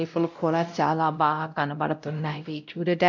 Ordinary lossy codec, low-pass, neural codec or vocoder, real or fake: none; 7.2 kHz; codec, 16 kHz, 0.5 kbps, X-Codec, WavLM features, trained on Multilingual LibriSpeech; fake